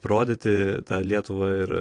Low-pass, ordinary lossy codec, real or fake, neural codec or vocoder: 9.9 kHz; AAC, 48 kbps; fake; vocoder, 22.05 kHz, 80 mel bands, WaveNeXt